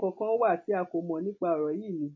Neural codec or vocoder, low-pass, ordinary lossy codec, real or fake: none; 7.2 kHz; MP3, 32 kbps; real